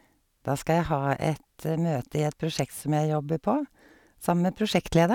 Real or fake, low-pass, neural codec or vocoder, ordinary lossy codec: real; 19.8 kHz; none; none